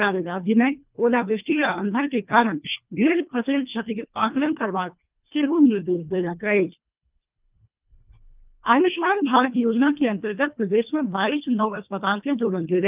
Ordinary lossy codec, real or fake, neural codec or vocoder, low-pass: Opus, 24 kbps; fake; codec, 24 kHz, 1.5 kbps, HILCodec; 3.6 kHz